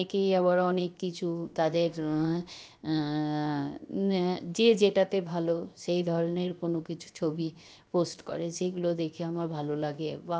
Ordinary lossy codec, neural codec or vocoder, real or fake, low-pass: none; codec, 16 kHz, 0.7 kbps, FocalCodec; fake; none